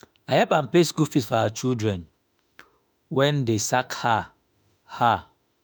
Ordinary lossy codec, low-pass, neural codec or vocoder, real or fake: none; none; autoencoder, 48 kHz, 32 numbers a frame, DAC-VAE, trained on Japanese speech; fake